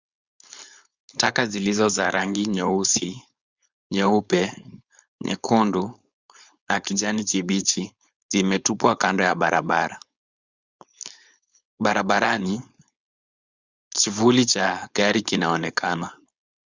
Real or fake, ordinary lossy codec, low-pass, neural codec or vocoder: fake; Opus, 64 kbps; 7.2 kHz; codec, 16 kHz, 4.8 kbps, FACodec